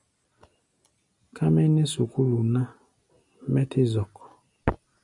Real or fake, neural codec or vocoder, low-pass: real; none; 10.8 kHz